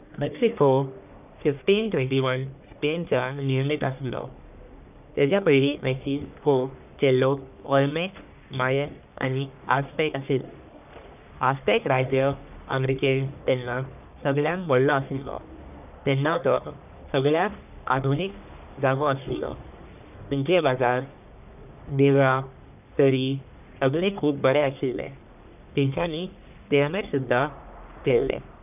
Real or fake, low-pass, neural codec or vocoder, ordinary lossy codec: fake; 3.6 kHz; codec, 44.1 kHz, 1.7 kbps, Pupu-Codec; none